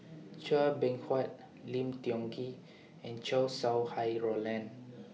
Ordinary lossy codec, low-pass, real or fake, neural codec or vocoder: none; none; real; none